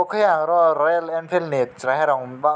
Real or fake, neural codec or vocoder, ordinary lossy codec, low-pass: real; none; none; none